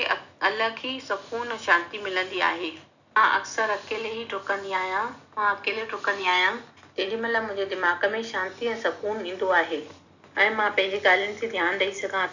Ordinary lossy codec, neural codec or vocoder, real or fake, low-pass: none; none; real; 7.2 kHz